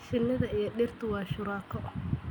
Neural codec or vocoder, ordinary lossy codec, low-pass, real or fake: none; none; none; real